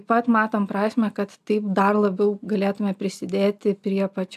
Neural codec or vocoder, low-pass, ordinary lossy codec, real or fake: none; 14.4 kHz; AAC, 96 kbps; real